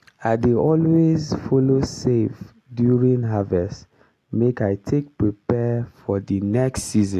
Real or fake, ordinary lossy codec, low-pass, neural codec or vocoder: real; AAC, 64 kbps; 14.4 kHz; none